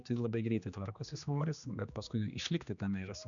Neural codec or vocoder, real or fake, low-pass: codec, 16 kHz, 2 kbps, X-Codec, HuBERT features, trained on general audio; fake; 7.2 kHz